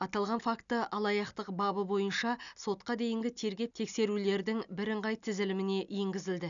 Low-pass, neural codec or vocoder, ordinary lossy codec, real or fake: 7.2 kHz; none; none; real